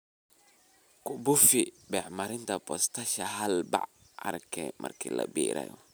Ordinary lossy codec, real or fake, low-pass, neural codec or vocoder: none; real; none; none